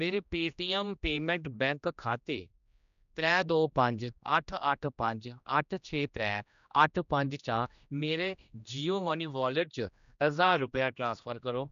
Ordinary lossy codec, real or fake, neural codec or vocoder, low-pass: none; fake; codec, 16 kHz, 1 kbps, X-Codec, HuBERT features, trained on general audio; 7.2 kHz